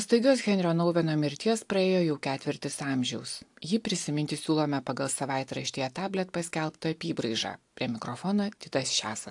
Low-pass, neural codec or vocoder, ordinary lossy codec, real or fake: 10.8 kHz; none; AAC, 64 kbps; real